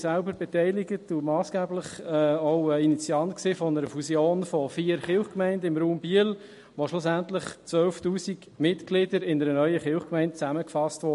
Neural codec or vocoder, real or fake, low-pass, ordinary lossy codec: none; real; 14.4 kHz; MP3, 48 kbps